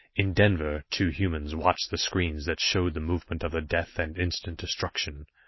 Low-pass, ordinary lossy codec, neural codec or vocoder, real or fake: 7.2 kHz; MP3, 24 kbps; none; real